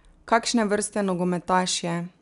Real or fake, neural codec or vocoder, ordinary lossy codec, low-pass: real; none; none; 10.8 kHz